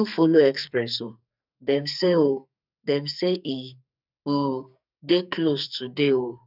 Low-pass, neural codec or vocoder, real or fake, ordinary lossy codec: 5.4 kHz; codec, 44.1 kHz, 2.6 kbps, SNAC; fake; none